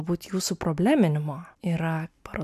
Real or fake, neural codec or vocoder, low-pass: real; none; 14.4 kHz